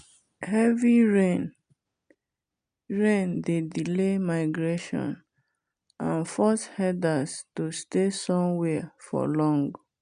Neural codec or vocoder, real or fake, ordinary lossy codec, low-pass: none; real; none; 9.9 kHz